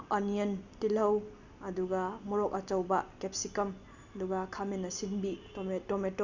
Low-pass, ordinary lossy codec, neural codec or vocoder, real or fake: 7.2 kHz; none; none; real